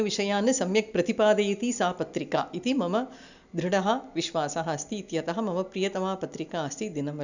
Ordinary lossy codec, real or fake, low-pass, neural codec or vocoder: none; real; 7.2 kHz; none